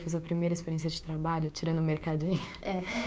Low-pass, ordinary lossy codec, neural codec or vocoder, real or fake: none; none; codec, 16 kHz, 6 kbps, DAC; fake